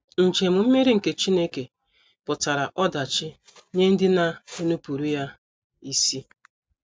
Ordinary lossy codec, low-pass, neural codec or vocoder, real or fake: none; none; none; real